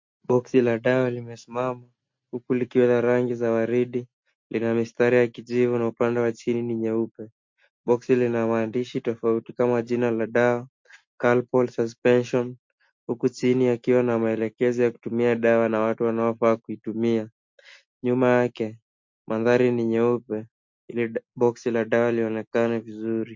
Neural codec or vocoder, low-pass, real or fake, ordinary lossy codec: none; 7.2 kHz; real; MP3, 48 kbps